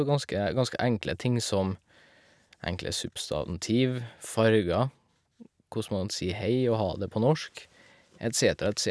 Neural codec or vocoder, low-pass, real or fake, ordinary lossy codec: none; none; real; none